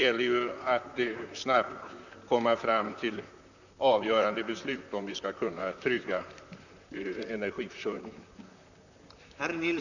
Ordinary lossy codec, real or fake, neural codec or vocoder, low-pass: none; fake; vocoder, 44.1 kHz, 128 mel bands, Pupu-Vocoder; 7.2 kHz